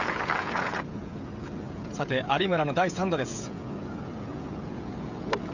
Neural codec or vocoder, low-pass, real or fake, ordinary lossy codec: codec, 16 kHz, 16 kbps, FreqCodec, smaller model; 7.2 kHz; fake; none